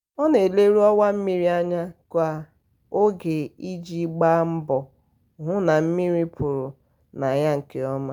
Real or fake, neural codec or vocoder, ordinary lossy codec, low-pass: real; none; none; 19.8 kHz